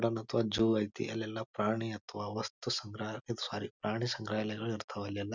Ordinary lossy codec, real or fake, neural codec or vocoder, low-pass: none; real; none; 7.2 kHz